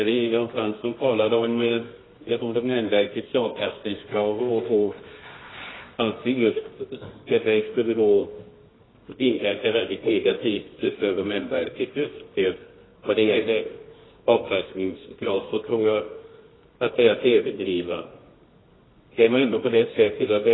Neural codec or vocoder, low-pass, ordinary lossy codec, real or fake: codec, 24 kHz, 0.9 kbps, WavTokenizer, medium music audio release; 7.2 kHz; AAC, 16 kbps; fake